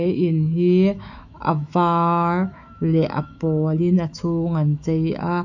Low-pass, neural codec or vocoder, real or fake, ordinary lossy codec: 7.2 kHz; autoencoder, 48 kHz, 128 numbers a frame, DAC-VAE, trained on Japanese speech; fake; none